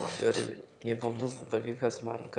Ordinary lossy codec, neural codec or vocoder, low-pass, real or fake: MP3, 96 kbps; autoencoder, 22.05 kHz, a latent of 192 numbers a frame, VITS, trained on one speaker; 9.9 kHz; fake